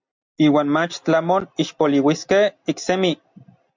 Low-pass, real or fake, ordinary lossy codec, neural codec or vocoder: 7.2 kHz; real; MP3, 64 kbps; none